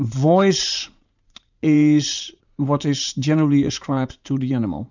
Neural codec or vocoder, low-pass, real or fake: vocoder, 44.1 kHz, 80 mel bands, Vocos; 7.2 kHz; fake